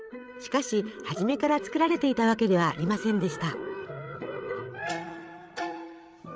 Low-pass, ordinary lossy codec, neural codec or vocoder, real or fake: none; none; codec, 16 kHz, 8 kbps, FreqCodec, larger model; fake